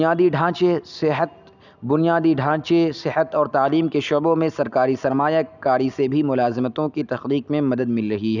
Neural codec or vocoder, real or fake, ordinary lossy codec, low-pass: none; real; none; 7.2 kHz